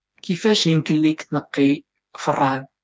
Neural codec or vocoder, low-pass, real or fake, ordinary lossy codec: codec, 16 kHz, 2 kbps, FreqCodec, smaller model; none; fake; none